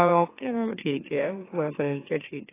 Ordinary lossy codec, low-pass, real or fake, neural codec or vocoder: AAC, 16 kbps; 3.6 kHz; fake; autoencoder, 44.1 kHz, a latent of 192 numbers a frame, MeloTTS